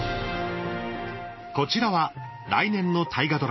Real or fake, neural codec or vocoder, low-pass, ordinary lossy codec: real; none; 7.2 kHz; MP3, 24 kbps